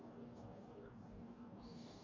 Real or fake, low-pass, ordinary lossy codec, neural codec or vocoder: fake; 7.2 kHz; MP3, 48 kbps; codec, 44.1 kHz, 2.6 kbps, DAC